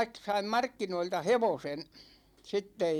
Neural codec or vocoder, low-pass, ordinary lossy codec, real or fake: none; 19.8 kHz; none; real